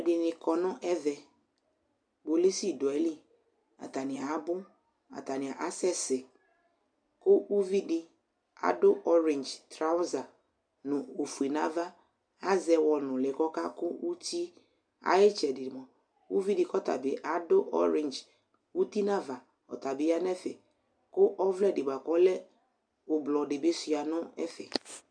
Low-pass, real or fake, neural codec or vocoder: 9.9 kHz; real; none